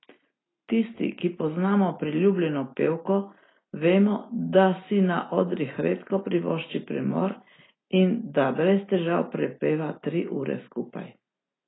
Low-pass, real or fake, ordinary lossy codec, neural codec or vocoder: 7.2 kHz; real; AAC, 16 kbps; none